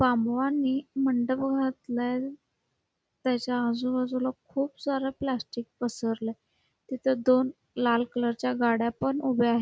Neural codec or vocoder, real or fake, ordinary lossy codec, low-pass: none; real; none; none